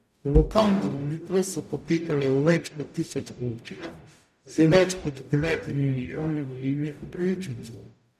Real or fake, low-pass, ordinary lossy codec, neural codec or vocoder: fake; 14.4 kHz; none; codec, 44.1 kHz, 0.9 kbps, DAC